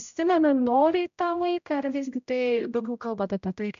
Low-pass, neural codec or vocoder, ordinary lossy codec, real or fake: 7.2 kHz; codec, 16 kHz, 0.5 kbps, X-Codec, HuBERT features, trained on general audio; MP3, 64 kbps; fake